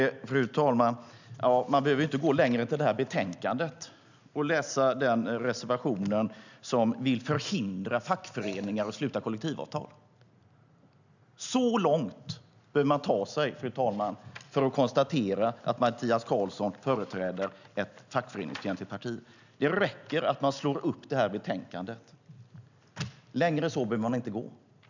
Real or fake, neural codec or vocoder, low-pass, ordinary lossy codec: real; none; 7.2 kHz; none